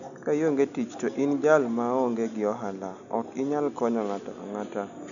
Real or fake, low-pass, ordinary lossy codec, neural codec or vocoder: real; 7.2 kHz; none; none